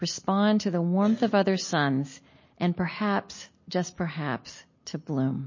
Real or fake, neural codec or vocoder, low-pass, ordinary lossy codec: real; none; 7.2 kHz; MP3, 32 kbps